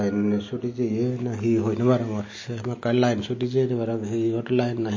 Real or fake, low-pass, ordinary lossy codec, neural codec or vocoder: real; 7.2 kHz; MP3, 32 kbps; none